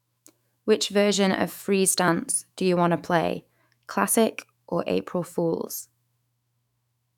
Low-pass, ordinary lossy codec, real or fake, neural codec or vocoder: 19.8 kHz; none; fake; autoencoder, 48 kHz, 128 numbers a frame, DAC-VAE, trained on Japanese speech